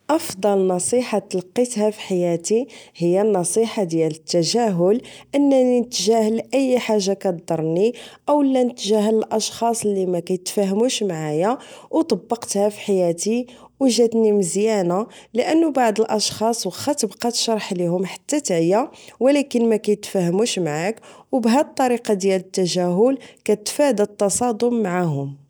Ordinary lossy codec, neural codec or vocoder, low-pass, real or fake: none; none; none; real